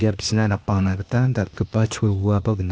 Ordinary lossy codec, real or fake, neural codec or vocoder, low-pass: none; fake; codec, 16 kHz, 0.8 kbps, ZipCodec; none